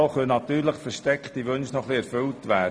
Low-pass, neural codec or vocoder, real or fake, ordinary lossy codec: none; none; real; none